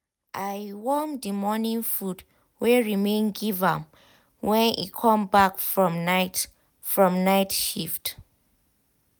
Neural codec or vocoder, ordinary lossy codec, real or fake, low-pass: none; none; real; none